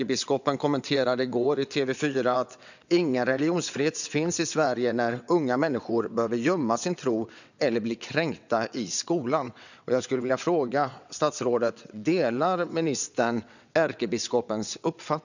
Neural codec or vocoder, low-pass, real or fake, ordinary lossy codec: vocoder, 22.05 kHz, 80 mel bands, WaveNeXt; 7.2 kHz; fake; none